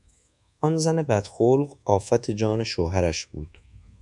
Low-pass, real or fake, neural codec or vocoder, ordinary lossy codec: 10.8 kHz; fake; codec, 24 kHz, 1.2 kbps, DualCodec; MP3, 96 kbps